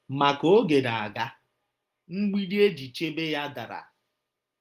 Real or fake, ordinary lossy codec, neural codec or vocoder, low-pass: real; Opus, 24 kbps; none; 14.4 kHz